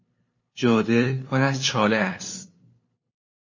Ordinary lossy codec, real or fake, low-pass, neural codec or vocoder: MP3, 32 kbps; fake; 7.2 kHz; codec, 16 kHz, 2 kbps, FunCodec, trained on LibriTTS, 25 frames a second